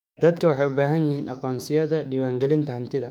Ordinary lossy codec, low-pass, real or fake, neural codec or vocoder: none; 19.8 kHz; fake; autoencoder, 48 kHz, 32 numbers a frame, DAC-VAE, trained on Japanese speech